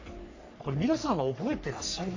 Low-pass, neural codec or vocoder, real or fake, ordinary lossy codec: 7.2 kHz; codec, 44.1 kHz, 3.4 kbps, Pupu-Codec; fake; AAC, 48 kbps